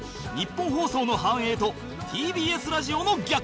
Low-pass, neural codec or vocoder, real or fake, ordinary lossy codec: none; none; real; none